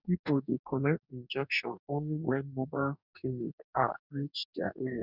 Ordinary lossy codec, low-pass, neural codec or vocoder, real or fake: none; 5.4 kHz; codec, 44.1 kHz, 2.6 kbps, DAC; fake